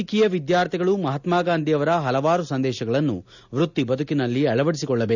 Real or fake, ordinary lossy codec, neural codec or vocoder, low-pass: real; none; none; 7.2 kHz